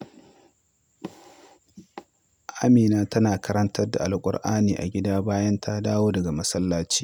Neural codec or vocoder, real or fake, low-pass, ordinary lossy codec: none; real; 19.8 kHz; none